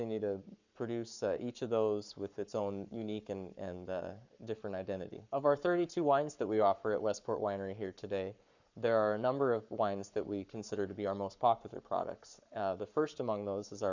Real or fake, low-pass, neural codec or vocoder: fake; 7.2 kHz; codec, 44.1 kHz, 7.8 kbps, Pupu-Codec